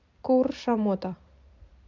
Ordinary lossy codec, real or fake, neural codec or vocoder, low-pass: MP3, 64 kbps; fake; vocoder, 44.1 kHz, 128 mel bands every 256 samples, BigVGAN v2; 7.2 kHz